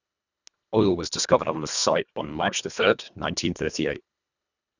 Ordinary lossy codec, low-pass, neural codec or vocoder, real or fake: none; 7.2 kHz; codec, 24 kHz, 1.5 kbps, HILCodec; fake